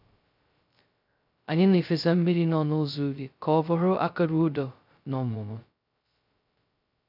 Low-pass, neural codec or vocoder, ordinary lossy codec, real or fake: 5.4 kHz; codec, 16 kHz, 0.2 kbps, FocalCodec; none; fake